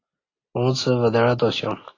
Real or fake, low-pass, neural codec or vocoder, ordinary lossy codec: real; 7.2 kHz; none; AAC, 32 kbps